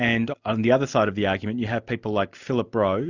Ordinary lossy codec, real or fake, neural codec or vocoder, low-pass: Opus, 64 kbps; real; none; 7.2 kHz